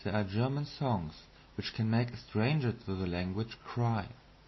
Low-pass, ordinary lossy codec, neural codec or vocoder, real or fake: 7.2 kHz; MP3, 24 kbps; none; real